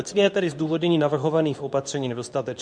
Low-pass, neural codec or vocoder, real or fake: 9.9 kHz; codec, 24 kHz, 0.9 kbps, WavTokenizer, medium speech release version 2; fake